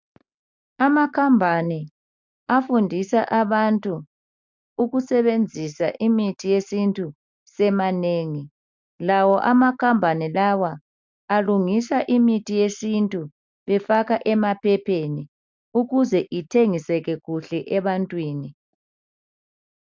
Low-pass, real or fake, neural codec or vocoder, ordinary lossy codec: 7.2 kHz; real; none; MP3, 64 kbps